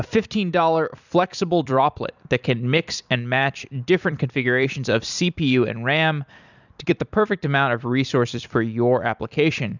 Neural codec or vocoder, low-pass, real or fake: vocoder, 44.1 kHz, 128 mel bands every 256 samples, BigVGAN v2; 7.2 kHz; fake